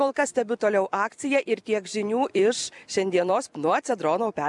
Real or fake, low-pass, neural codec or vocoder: fake; 10.8 kHz; vocoder, 44.1 kHz, 128 mel bands every 256 samples, BigVGAN v2